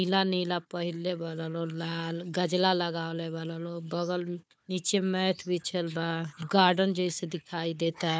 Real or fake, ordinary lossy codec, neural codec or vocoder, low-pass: fake; none; codec, 16 kHz, 4 kbps, FunCodec, trained on Chinese and English, 50 frames a second; none